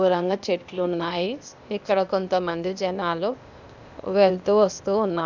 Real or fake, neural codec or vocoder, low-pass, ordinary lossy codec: fake; codec, 16 kHz, 0.8 kbps, ZipCodec; 7.2 kHz; none